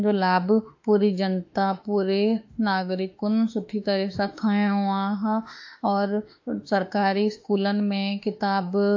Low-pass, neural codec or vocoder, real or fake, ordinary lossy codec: 7.2 kHz; autoencoder, 48 kHz, 32 numbers a frame, DAC-VAE, trained on Japanese speech; fake; AAC, 48 kbps